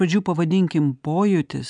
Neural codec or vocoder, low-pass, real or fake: none; 9.9 kHz; real